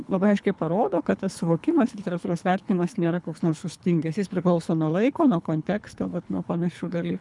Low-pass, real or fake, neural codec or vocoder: 10.8 kHz; fake; codec, 24 kHz, 3 kbps, HILCodec